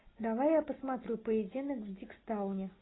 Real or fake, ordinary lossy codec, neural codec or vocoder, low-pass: real; AAC, 16 kbps; none; 7.2 kHz